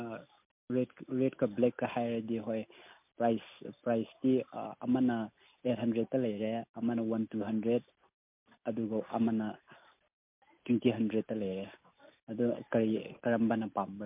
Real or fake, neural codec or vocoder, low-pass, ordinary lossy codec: real; none; 3.6 kHz; MP3, 32 kbps